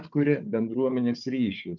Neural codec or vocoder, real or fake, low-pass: codec, 16 kHz, 4 kbps, FunCodec, trained on Chinese and English, 50 frames a second; fake; 7.2 kHz